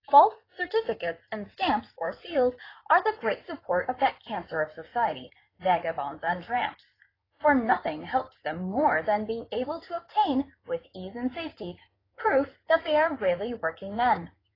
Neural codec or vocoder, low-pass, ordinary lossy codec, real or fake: codec, 16 kHz in and 24 kHz out, 2.2 kbps, FireRedTTS-2 codec; 5.4 kHz; AAC, 24 kbps; fake